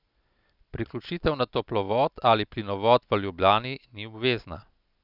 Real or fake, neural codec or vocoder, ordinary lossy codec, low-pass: real; none; none; 5.4 kHz